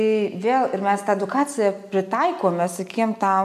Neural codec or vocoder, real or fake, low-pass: none; real; 14.4 kHz